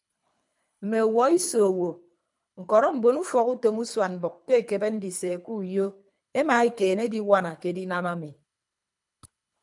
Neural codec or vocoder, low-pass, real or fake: codec, 24 kHz, 3 kbps, HILCodec; 10.8 kHz; fake